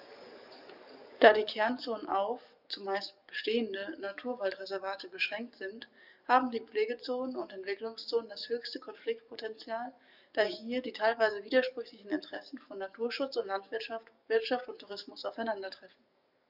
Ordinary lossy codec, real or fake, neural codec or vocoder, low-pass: none; fake; codec, 44.1 kHz, 7.8 kbps, DAC; 5.4 kHz